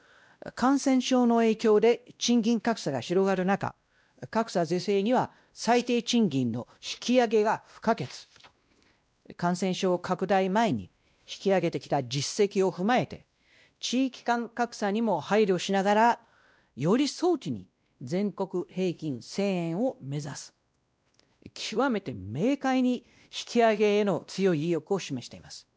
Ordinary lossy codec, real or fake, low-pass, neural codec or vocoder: none; fake; none; codec, 16 kHz, 1 kbps, X-Codec, WavLM features, trained on Multilingual LibriSpeech